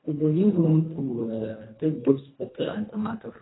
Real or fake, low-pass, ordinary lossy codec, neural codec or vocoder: fake; 7.2 kHz; AAC, 16 kbps; codec, 24 kHz, 1.5 kbps, HILCodec